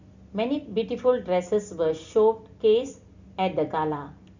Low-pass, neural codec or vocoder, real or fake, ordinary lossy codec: 7.2 kHz; none; real; Opus, 64 kbps